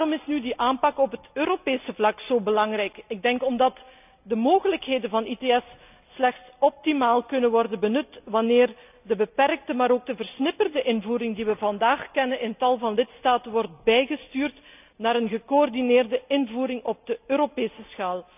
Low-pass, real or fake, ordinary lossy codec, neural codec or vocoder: 3.6 kHz; real; none; none